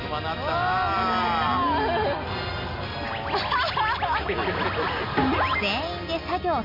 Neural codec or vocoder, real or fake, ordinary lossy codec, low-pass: none; real; MP3, 32 kbps; 5.4 kHz